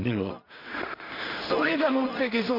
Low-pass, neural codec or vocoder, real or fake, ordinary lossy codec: 5.4 kHz; codec, 16 kHz in and 24 kHz out, 0.4 kbps, LongCat-Audio-Codec, two codebook decoder; fake; MP3, 48 kbps